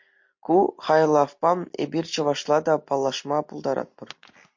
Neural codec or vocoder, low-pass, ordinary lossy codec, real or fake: none; 7.2 kHz; MP3, 48 kbps; real